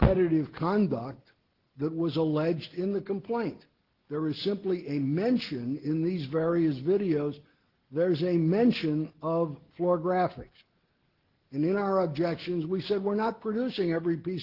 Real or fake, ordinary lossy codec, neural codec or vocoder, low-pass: real; Opus, 16 kbps; none; 5.4 kHz